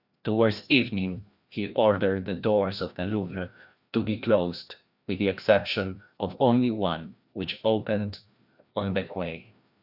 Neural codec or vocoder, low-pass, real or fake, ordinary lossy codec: codec, 16 kHz, 1 kbps, FreqCodec, larger model; 5.4 kHz; fake; Opus, 64 kbps